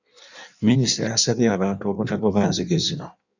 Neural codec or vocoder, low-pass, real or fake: codec, 16 kHz in and 24 kHz out, 1.1 kbps, FireRedTTS-2 codec; 7.2 kHz; fake